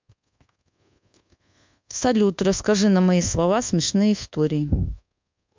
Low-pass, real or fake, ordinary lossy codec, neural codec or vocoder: 7.2 kHz; fake; MP3, 64 kbps; codec, 24 kHz, 1.2 kbps, DualCodec